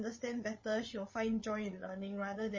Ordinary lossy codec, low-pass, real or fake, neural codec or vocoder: MP3, 32 kbps; 7.2 kHz; fake; codec, 16 kHz, 8 kbps, FreqCodec, larger model